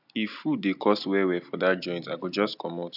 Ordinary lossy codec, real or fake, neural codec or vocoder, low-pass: none; real; none; 5.4 kHz